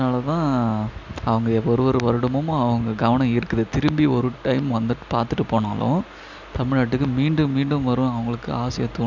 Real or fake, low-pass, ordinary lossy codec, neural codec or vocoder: real; 7.2 kHz; none; none